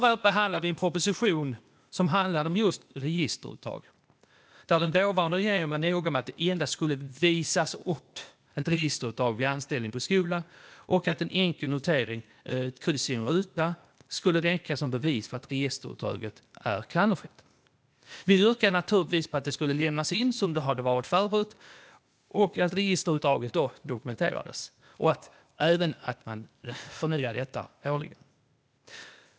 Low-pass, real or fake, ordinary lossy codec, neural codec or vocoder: none; fake; none; codec, 16 kHz, 0.8 kbps, ZipCodec